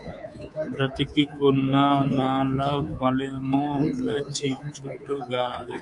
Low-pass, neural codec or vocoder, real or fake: 10.8 kHz; codec, 24 kHz, 3.1 kbps, DualCodec; fake